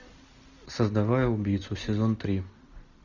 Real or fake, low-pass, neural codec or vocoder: real; 7.2 kHz; none